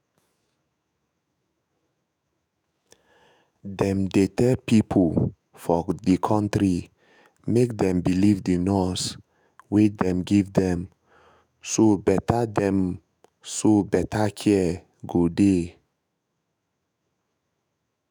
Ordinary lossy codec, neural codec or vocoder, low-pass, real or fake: none; autoencoder, 48 kHz, 128 numbers a frame, DAC-VAE, trained on Japanese speech; none; fake